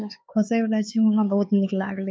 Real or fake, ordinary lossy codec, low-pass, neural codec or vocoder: fake; none; none; codec, 16 kHz, 4 kbps, X-Codec, HuBERT features, trained on LibriSpeech